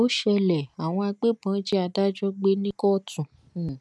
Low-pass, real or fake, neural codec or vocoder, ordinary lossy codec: none; real; none; none